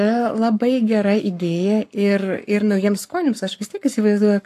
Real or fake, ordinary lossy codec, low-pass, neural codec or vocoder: fake; AAC, 64 kbps; 14.4 kHz; codec, 44.1 kHz, 7.8 kbps, Pupu-Codec